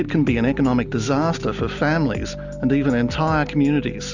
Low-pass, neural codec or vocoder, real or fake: 7.2 kHz; none; real